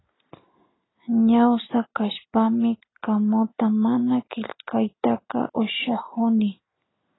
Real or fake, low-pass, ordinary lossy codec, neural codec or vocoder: real; 7.2 kHz; AAC, 16 kbps; none